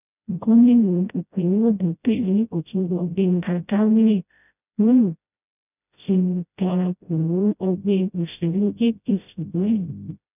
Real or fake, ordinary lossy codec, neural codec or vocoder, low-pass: fake; none; codec, 16 kHz, 0.5 kbps, FreqCodec, smaller model; 3.6 kHz